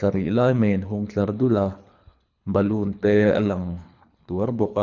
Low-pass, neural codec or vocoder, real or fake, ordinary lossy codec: 7.2 kHz; codec, 24 kHz, 3 kbps, HILCodec; fake; none